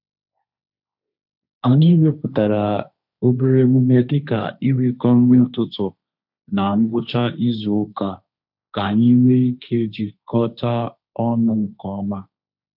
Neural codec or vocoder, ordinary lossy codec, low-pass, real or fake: codec, 16 kHz, 1.1 kbps, Voila-Tokenizer; none; 5.4 kHz; fake